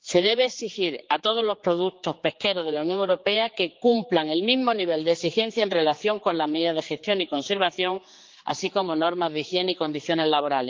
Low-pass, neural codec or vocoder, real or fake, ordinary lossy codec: 7.2 kHz; codec, 16 kHz, 4 kbps, X-Codec, HuBERT features, trained on general audio; fake; Opus, 32 kbps